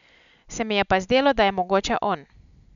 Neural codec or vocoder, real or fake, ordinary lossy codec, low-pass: none; real; none; 7.2 kHz